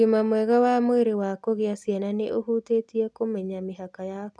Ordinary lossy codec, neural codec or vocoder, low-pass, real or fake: none; none; none; real